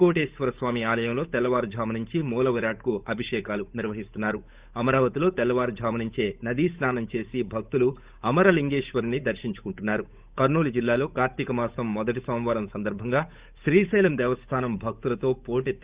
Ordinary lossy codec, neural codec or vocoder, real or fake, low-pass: Opus, 64 kbps; codec, 16 kHz, 8 kbps, FunCodec, trained on Chinese and English, 25 frames a second; fake; 3.6 kHz